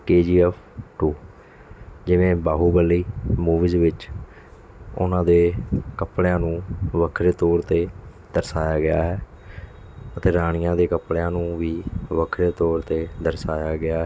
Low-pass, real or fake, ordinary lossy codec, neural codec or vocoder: none; real; none; none